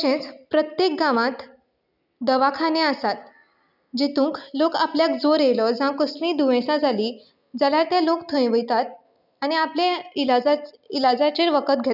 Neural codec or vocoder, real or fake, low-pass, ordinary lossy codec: none; real; 5.4 kHz; none